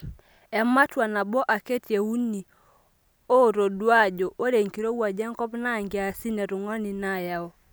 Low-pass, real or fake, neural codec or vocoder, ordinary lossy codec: none; real; none; none